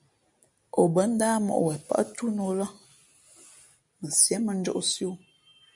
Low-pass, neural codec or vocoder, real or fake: 10.8 kHz; none; real